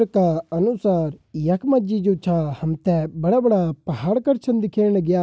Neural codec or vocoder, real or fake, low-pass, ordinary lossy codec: none; real; none; none